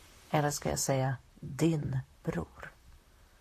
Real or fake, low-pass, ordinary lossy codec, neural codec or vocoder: fake; 14.4 kHz; AAC, 64 kbps; vocoder, 44.1 kHz, 128 mel bands, Pupu-Vocoder